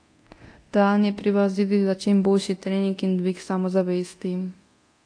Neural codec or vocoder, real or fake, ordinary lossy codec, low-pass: codec, 24 kHz, 0.9 kbps, DualCodec; fake; AAC, 48 kbps; 9.9 kHz